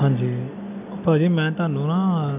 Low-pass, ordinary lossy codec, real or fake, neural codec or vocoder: 3.6 kHz; MP3, 32 kbps; real; none